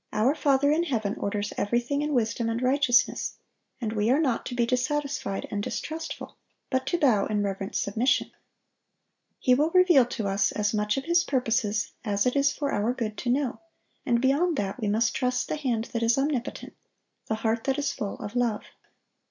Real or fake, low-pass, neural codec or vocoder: real; 7.2 kHz; none